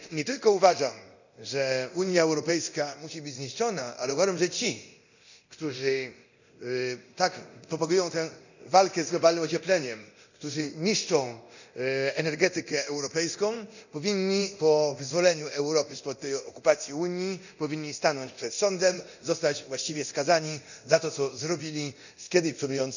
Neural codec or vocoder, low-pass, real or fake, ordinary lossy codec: codec, 24 kHz, 0.9 kbps, DualCodec; 7.2 kHz; fake; none